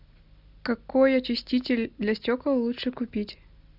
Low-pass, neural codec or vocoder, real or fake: 5.4 kHz; none; real